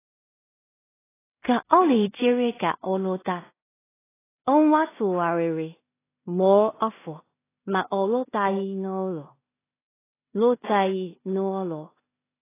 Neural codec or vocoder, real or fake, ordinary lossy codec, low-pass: codec, 16 kHz in and 24 kHz out, 0.4 kbps, LongCat-Audio-Codec, two codebook decoder; fake; AAC, 16 kbps; 3.6 kHz